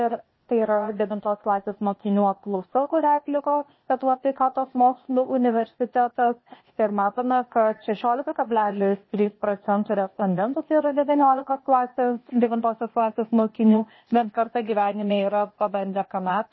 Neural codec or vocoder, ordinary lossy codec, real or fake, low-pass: codec, 16 kHz, 0.8 kbps, ZipCodec; MP3, 24 kbps; fake; 7.2 kHz